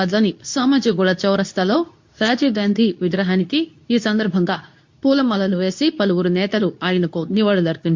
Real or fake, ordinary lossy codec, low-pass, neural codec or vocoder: fake; MP3, 48 kbps; 7.2 kHz; codec, 24 kHz, 0.9 kbps, WavTokenizer, medium speech release version 2